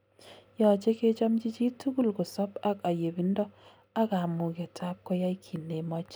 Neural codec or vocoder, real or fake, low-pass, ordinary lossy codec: none; real; none; none